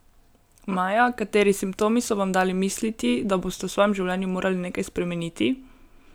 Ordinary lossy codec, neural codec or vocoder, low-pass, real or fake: none; none; none; real